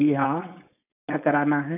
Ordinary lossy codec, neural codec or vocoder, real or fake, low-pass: none; codec, 16 kHz, 4.8 kbps, FACodec; fake; 3.6 kHz